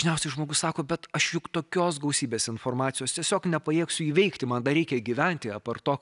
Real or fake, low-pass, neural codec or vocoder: real; 10.8 kHz; none